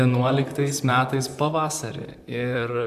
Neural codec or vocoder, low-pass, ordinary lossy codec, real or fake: vocoder, 44.1 kHz, 128 mel bands, Pupu-Vocoder; 14.4 kHz; AAC, 96 kbps; fake